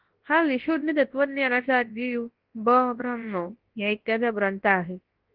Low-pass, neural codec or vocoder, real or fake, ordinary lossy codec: 5.4 kHz; codec, 24 kHz, 0.9 kbps, WavTokenizer, large speech release; fake; Opus, 16 kbps